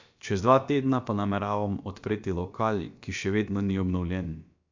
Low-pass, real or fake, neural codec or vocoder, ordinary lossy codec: 7.2 kHz; fake; codec, 16 kHz, about 1 kbps, DyCAST, with the encoder's durations; MP3, 64 kbps